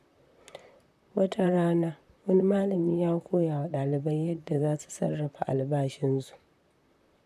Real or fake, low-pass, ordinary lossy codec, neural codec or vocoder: fake; 14.4 kHz; none; vocoder, 44.1 kHz, 128 mel bands, Pupu-Vocoder